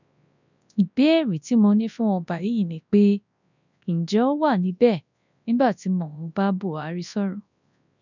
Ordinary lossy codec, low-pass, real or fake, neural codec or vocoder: none; 7.2 kHz; fake; codec, 24 kHz, 0.9 kbps, WavTokenizer, large speech release